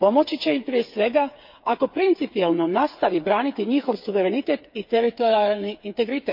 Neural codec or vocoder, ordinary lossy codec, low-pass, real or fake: codec, 16 kHz, 8 kbps, FreqCodec, smaller model; MP3, 48 kbps; 5.4 kHz; fake